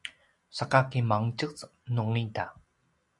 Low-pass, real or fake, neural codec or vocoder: 10.8 kHz; real; none